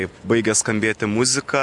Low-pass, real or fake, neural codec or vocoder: 10.8 kHz; real; none